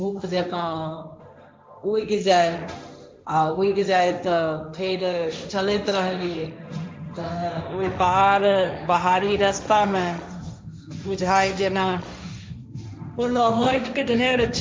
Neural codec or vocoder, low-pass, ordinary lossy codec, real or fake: codec, 16 kHz, 1.1 kbps, Voila-Tokenizer; none; none; fake